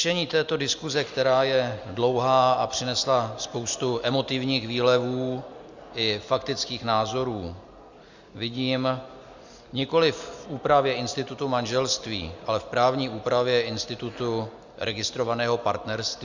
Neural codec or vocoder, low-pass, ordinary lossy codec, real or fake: none; 7.2 kHz; Opus, 64 kbps; real